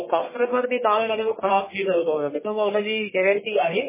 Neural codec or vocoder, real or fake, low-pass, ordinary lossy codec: codec, 44.1 kHz, 1.7 kbps, Pupu-Codec; fake; 3.6 kHz; MP3, 16 kbps